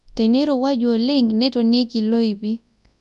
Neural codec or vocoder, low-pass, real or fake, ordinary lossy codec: codec, 24 kHz, 0.9 kbps, WavTokenizer, large speech release; 10.8 kHz; fake; MP3, 96 kbps